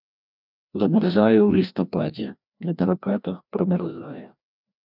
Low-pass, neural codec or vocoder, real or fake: 5.4 kHz; codec, 16 kHz, 1 kbps, FreqCodec, larger model; fake